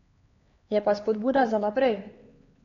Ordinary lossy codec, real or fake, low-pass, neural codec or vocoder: AAC, 32 kbps; fake; 7.2 kHz; codec, 16 kHz, 4 kbps, X-Codec, HuBERT features, trained on LibriSpeech